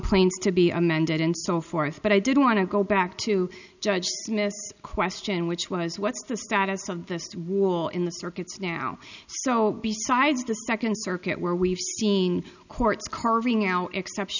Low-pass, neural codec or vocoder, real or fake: 7.2 kHz; none; real